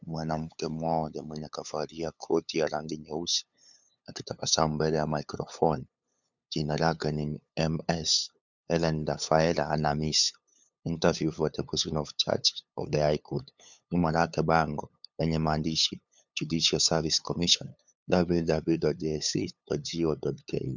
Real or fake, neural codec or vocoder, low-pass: fake; codec, 16 kHz, 8 kbps, FunCodec, trained on LibriTTS, 25 frames a second; 7.2 kHz